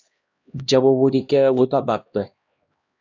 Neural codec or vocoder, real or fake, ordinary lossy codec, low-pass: codec, 16 kHz, 1 kbps, X-Codec, HuBERT features, trained on LibriSpeech; fake; AAC, 48 kbps; 7.2 kHz